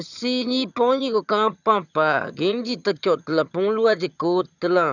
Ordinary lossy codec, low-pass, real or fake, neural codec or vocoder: none; 7.2 kHz; fake; vocoder, 22.05 kHz, 80 mel bands, HiFi-GAN